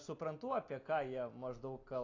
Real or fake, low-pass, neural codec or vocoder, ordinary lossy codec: real; 7.2 kHz; none; AAC, 32 kbps